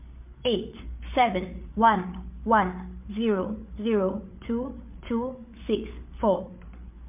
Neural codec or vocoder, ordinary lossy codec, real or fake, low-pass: codec, 16 kHz, 8 kbps, FreqCodec, larger model; MP3, 32 kbps; fake; 3.6 kHz